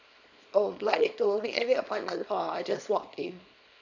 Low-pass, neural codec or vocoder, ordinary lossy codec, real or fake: 7.2 kHz; codec, 24 kHz, 0.9 kbps, WavTokenizer, small release; none; fake